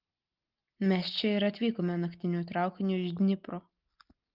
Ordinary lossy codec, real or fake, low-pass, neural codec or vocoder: Opus, 24 kbps; real; 5.4 kHz; none